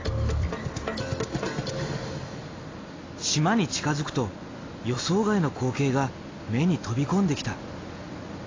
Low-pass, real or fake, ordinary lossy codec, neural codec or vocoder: 7.2 kHz; real; AAC, 32 kbps; none